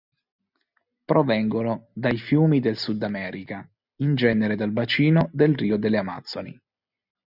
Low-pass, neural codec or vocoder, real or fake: 5.4 kHz; none; real